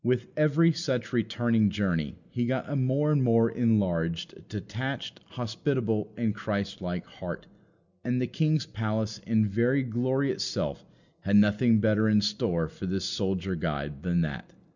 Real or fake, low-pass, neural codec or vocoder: real; 7.2 kHz; none